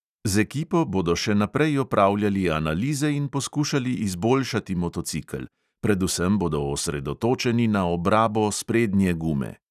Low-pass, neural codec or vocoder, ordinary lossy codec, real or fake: 14.4 kHz; none; none; real